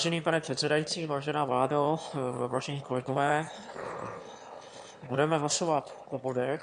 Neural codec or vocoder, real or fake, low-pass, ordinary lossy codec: autoencoder, 22.05 kHz, a latent of 192 numbers a frame, VITS, trained on one speaker; fake; 9.9 kHz; MP3, 64 kbps